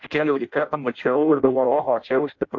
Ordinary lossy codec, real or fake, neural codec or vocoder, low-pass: AAC, 48 kbps; fake; codec, 16 kHz in and 24 kHz out, 0.6 kbps, FireRedTTS-2 codec; 7.2 kHz